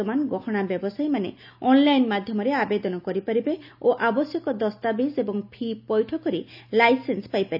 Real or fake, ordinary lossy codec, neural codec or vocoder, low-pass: real; none; none; 5.4 kHz